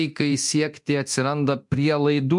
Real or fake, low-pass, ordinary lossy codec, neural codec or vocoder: fake; 10.8 kHz; MP3, 64 kbps; codec, 24 kHz, 0.9 kbps, DualCodec